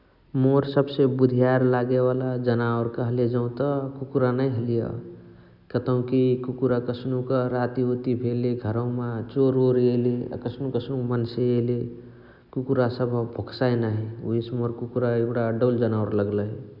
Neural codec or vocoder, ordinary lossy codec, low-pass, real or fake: none; none; 5.4 kHz; real